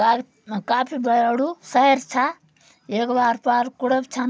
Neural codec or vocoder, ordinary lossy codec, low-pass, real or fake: none; none; none; real